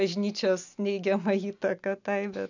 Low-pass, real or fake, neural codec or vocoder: 7.2 kHz; real; none